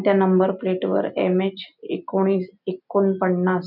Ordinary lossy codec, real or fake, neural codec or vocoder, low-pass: none; real; none; 5.4 kHz